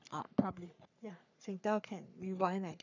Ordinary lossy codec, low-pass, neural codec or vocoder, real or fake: none; 7.2 kHz; codec, 44.1 kHz, 3.4 kbps, Pupu-Codec; fake